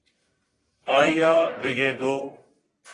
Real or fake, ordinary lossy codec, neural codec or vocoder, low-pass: fake; AAC, 32 kbps; codec, 44.1 kHz, 1.7 kbps, Pupu-Codec; 10.8 kHz